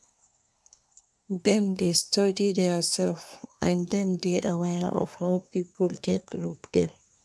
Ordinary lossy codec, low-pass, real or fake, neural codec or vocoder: none; none; fake; codec, 24 kHz, 1 kbps, SNAC